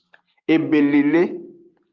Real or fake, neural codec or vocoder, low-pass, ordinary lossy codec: real; none; 7.2 kHz; Opus, 32 kbps